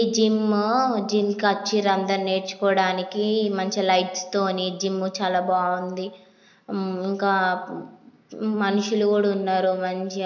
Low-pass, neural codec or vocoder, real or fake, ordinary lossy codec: 7.2 kHz; none; real; none